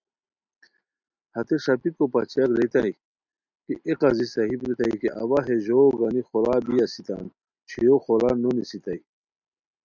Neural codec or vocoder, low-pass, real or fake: none; 7.2 kHz; real